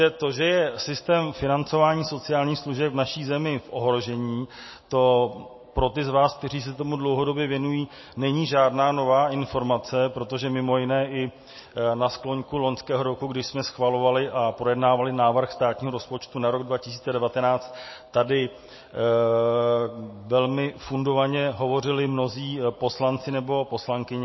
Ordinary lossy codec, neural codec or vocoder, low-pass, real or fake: MP3, 24 kbps; none; 7.2 kHz; real